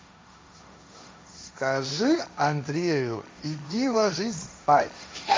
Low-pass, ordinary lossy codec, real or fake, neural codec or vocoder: none; none; fake; codec, 16 kHz, 1.1 kbps, Voila-Tokenizer